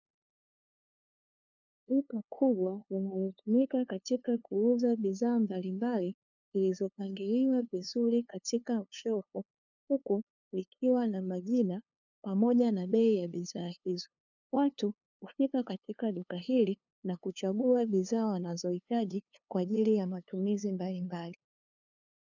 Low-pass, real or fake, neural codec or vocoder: 7.2 kHz; fake; codec, 16 kHz, 2 kbps, FunCodec, trained on LibriTTS, 25 frames a second